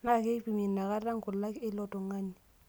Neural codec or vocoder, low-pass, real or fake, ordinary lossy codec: none; none; real; none